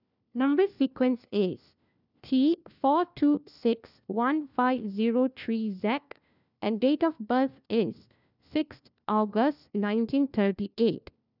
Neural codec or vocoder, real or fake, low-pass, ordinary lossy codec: codec, 16 kHz, 1 kbps, FunCodec, trained on LibriTTS, 50 frames a second; fake; 5.4 kHz; none